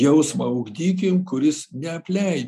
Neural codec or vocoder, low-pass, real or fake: none; 14.4 kHz; real